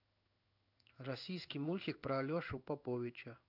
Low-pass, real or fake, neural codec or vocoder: 5.4 kHz; fake; codec, 16 kHz in and 24 kHz out, 1 kbps, XY-Tokenizer